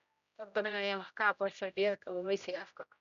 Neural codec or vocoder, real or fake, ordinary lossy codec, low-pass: codec, 16 kHz, 0.5 kbps, X-Codec, HuBERT features, trained on general audio; fake; none; 7.2 kHz